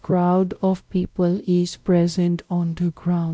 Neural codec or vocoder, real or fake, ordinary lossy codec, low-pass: codec, 16 kHz, 0.5 kbps, X-Codec, WavLM features, trained on Multilingual LibriSpeech; fake; none; none